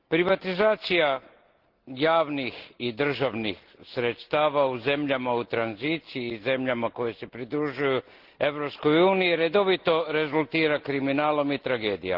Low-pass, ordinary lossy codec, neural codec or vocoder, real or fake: 5.4 kHz; Opus, 16 kbps; none; real